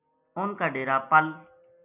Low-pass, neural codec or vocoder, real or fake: 3.6 kHz; none; real